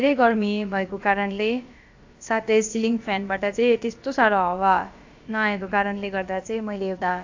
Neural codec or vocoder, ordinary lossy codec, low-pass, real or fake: codec, 16 kHz, about 1 kbps, DyCAST, with the encoder's durations; AAC, 48 kbps; 7.2 kHz; fake